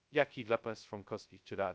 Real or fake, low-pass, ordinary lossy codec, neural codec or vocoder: fake; none; none; codec, 16 kHz, 0.2 kbps, FocalCodec